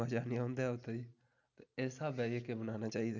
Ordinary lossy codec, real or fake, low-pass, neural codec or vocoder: none; real; 7.2 kHz; none